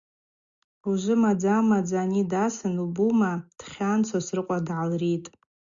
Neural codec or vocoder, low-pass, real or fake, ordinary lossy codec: none; 7.2 kHz; real; Opus, 64 kbps